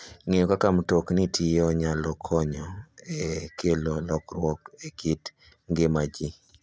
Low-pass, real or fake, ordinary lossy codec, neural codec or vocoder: none; real; none; none